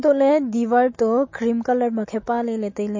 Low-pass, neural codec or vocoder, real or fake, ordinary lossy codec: 7.2 kHz; none; real; MP3, 32 kbps